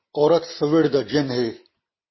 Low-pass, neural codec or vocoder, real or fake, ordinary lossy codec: 7.2 kHz; none; real; MP3, 24 kbps